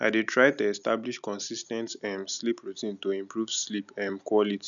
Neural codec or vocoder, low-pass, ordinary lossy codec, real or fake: none; 7.2 kHz; none; real